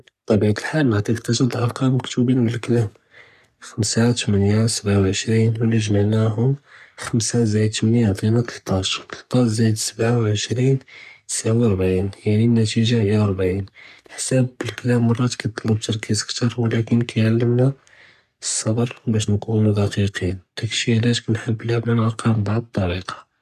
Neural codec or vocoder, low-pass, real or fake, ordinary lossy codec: codec, 44.1 kHz, 3.4 kbps, Pupu-Codec; 14.4 kHz; fake; none